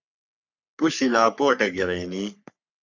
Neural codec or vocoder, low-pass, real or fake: codec, 44.1 kHz, 3.4 kbps, Pupu-Codec; 7.2 kHz; fake